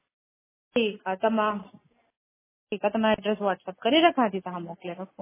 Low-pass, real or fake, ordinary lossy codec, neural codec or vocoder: 3.6 kHz; real; MP3, 16 kbps; none